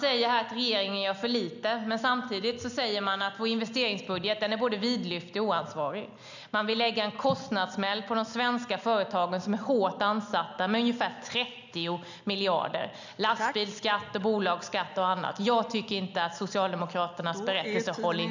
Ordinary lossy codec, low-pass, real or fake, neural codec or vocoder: none; 7.2 kHz; real; none